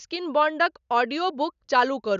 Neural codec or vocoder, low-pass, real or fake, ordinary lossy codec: none; 7.2 kHz; real; none